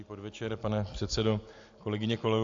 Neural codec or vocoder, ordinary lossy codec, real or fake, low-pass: none; AAC, 48 kbps; real; 7.2 kHz